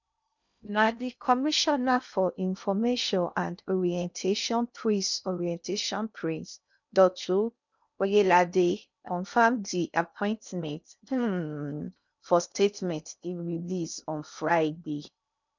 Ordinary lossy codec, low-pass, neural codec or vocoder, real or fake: none; 7.2 kHz; codec, 16 kHz in and 24 kHz out, 0.6 kbps, FocalCodec, streaming, 2048 codes; fake